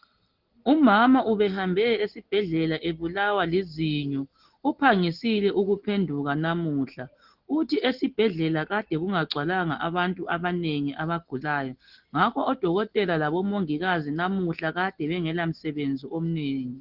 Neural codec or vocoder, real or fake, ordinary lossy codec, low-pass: none; real; Opus, 16 kbps; 5.4 kHz